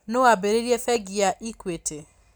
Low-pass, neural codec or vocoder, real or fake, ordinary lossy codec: none; none; real; none